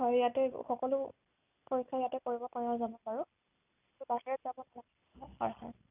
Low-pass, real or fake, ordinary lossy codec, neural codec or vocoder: 3.6 kHz; real; none; none